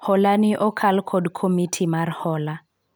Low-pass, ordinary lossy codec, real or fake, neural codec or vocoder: none; none; real; none